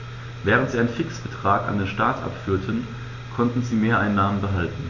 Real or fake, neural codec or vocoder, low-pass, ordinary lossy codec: real; none; 7.2 kHz; MP3, 64 kbps